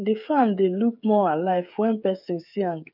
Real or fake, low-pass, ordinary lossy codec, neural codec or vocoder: fake; 5.4 kHz; none; codec, 16 kHz, 8 kbps, FreqCodec, smaller model